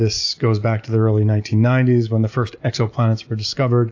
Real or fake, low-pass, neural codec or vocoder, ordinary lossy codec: real; 7.2 kHz; none; AAC, 48 kbps